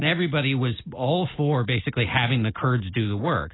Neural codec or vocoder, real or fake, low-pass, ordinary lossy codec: none; real; 7.2 kHz; AAC, 16 kbps